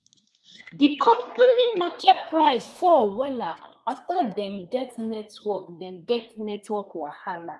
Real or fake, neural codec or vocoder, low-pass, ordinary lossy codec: fake; codec, 24 kHz, 1 kbps, SNAC; none; none